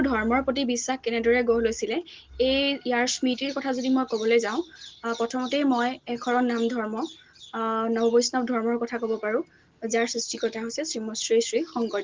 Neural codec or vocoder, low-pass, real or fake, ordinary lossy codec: none; 7.2 kHz; real; Opus, 16 kbps